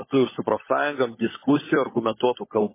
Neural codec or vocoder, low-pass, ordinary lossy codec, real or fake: codec, 16 kHz, 16 kbps, FunCodec, trained on LibriTTS, 50 frames a second; 3.6 kHz; MP3, 16 kbps; fake